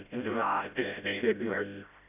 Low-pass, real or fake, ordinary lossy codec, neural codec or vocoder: 3.6 kHz; fake; none; codec, 16 kHz, 0.5 kbps, FreqCodec, smaller model